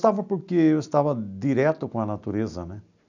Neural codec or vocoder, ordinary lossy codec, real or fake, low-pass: none; none; real; 7.2 kHz